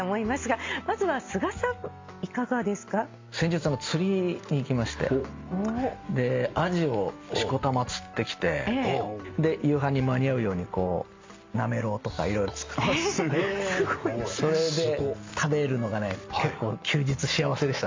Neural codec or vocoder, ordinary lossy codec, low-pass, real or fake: none; MP3, 48 kbps; 7.2 kHz; real